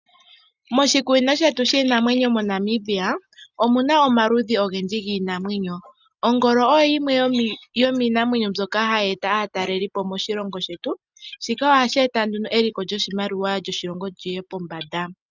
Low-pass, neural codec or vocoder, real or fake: 7.2 kHz; none; real